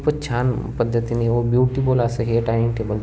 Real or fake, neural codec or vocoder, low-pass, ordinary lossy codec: real; none; none; none